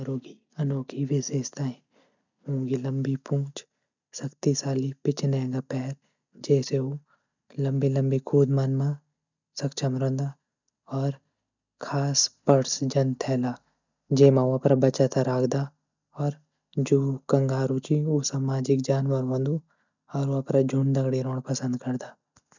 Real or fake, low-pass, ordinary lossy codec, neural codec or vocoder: real; 7.2 kHz; none; none